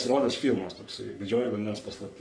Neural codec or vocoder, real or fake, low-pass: codec, 44.1 kHz, 3.4 kbps, Pupu-Codec; fake; 9.9 kHz